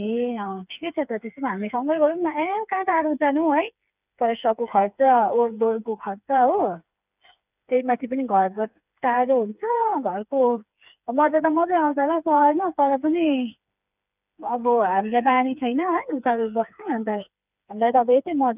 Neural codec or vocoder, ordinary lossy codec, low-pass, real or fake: codec, 16 kHz, 4 kbps, FreqCodec, smaller model; none; 3.6 kHz; fake